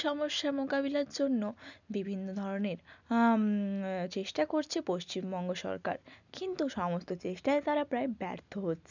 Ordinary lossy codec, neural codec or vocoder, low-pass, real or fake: none; none; 7.2 kHz; real